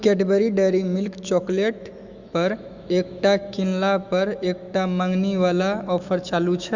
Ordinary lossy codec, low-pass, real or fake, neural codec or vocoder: Opus, 64 kbps; 7.2 kHz; fake; autoencoder, 48 kHz, 128 numbers a frame, DAC-VAE, trained on Japanese speech